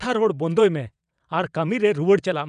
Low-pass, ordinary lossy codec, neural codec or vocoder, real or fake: 9.9 kHz; none; vocoder, 22.05 kHz, 80 mel bands, WaveNeXt; fake